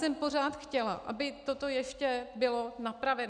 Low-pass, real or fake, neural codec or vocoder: 9.9 kHz; real; none